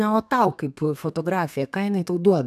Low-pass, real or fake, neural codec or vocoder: 14.4 kHz; fake; codec, 32 kHz, 1.9 kbps, SNAC